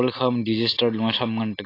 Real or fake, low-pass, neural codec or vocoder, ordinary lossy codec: real; 5.4 kHz; none; AAC, 24 kbps